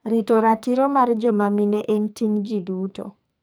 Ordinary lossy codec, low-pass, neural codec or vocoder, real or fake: none; none; codec, 44.1 kHz, 2.6 kbps, SNAC; fake